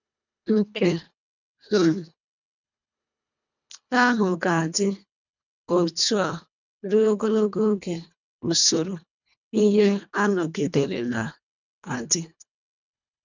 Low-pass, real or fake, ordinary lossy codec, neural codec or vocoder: 7.2 kHz; fake; none; codec, 24 kHz, 1.5 kbps, HILCodec